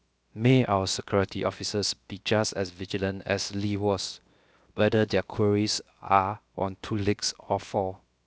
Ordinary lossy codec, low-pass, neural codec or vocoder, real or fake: none; none; codec, 16 kHz, 0.7 kbps, FocalCodec; fake